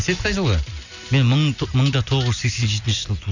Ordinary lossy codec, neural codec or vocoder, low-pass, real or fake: none; none; 7.2 kHz; real